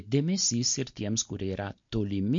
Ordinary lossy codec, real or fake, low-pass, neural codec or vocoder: MP3, 48 kbps; real; 7.2 kHz; none